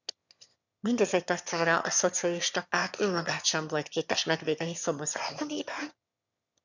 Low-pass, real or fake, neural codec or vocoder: 7.2 kHz; fake; autoencoder, 22.05 kHz, a latent of 192 numbers a frame, VITS, trained on one speaker